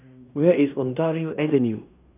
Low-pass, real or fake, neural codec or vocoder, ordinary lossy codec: 3.6 kHz; fake; codec, 16 kHz in and 24 kHz out, 0.9 kbps, LongCat-Audio-Codec, fine tuned four codebook decoder; none